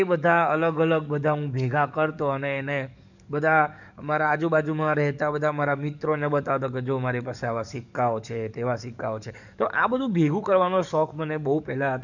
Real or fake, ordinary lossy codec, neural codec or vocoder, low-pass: fake; none; codec, 44.1 kHz, 7.8 kbps, DAC; 7.2 kHz